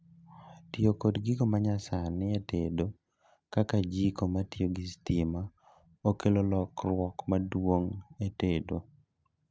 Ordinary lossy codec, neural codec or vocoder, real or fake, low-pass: none; none; real; none